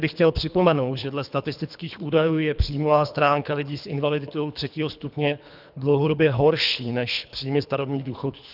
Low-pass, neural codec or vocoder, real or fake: 5.4 kHz; codec, 24 kHz, 3 kbps, HILCodec; fake